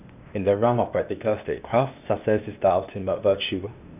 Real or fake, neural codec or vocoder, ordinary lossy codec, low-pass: fake; codec, 16 kHz, 0.8 kbps, ZipCodec; none; 3.6 kHz